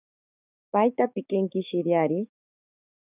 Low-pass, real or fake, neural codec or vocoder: 3.6 kHz; fake; codec, 16 kHz, 6 kbps, DAC